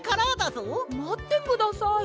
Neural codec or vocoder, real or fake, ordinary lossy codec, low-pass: none; real; none; none